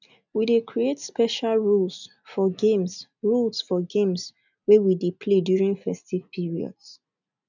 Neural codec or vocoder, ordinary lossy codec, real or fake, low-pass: none; none; real; 7.2 kHz